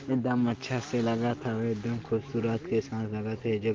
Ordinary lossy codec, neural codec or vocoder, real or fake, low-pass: Opus, 16 kbps; none; real; 7.2 kHz